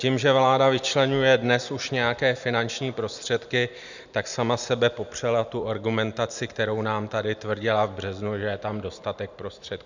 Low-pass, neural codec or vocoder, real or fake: 7.2 kHz; none; real